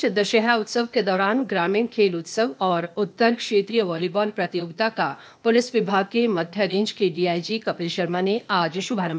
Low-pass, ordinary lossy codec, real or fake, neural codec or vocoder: none; none; fake; codec, 16 kHz, 0.8 kbps, ZipCodec